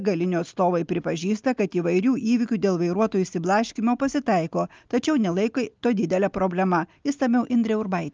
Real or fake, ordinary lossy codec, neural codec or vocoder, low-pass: real; Opus, 24 kbps; none; 7.2 kHz